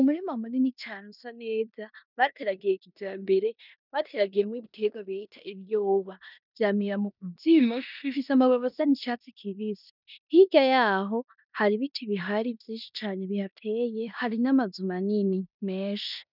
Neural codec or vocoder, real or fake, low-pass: codec, 16 kHz in and 24 kHz out, 0.9 kbps, LongCat-Audio-Codec, four codebook decoder; fake; 5.4 kHz